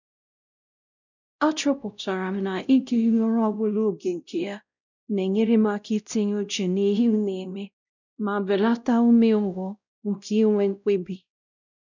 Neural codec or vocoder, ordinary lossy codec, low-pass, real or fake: codec, 16 kHz, 0.5 kbps, X-Codec, WavLM features, trained on Multilingual LibriSpeech; none; 7.2 kHz; fake